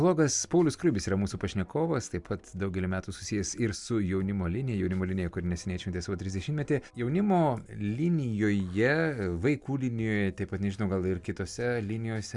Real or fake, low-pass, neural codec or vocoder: real; 10.8 kHz; none